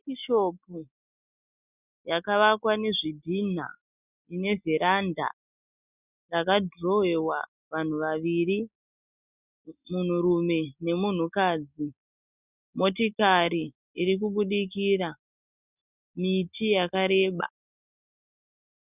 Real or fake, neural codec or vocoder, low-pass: real; none; 3.6 kHz